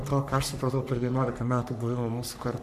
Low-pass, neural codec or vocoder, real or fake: 14.4 kHz; codec, 44.1 kHz, 3.4 kbps, Pupu-Codec; fake